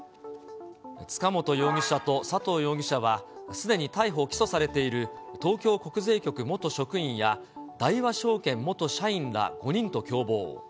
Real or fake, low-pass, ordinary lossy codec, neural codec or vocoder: real; none; none; none